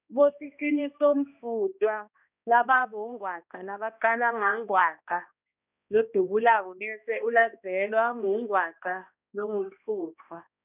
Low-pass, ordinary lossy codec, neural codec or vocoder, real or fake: 3.6 kHz; none; codec, 16 kHz, 1 kbps, X-Codec, HuBERT features, trained on balanced general audio; fake